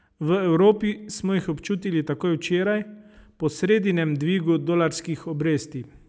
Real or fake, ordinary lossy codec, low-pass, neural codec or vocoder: real; none; none; none